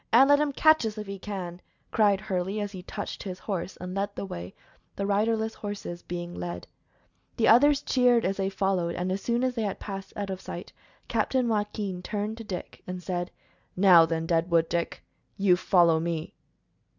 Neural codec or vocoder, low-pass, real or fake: none; 7.2 kHz; real